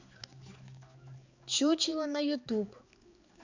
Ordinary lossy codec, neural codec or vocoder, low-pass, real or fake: Opus, 64 kbps; codec, 16 kHz, 4 kbps, X-Codec, HuBERT features, trained on balanced general audio; 7.2 kHz; fake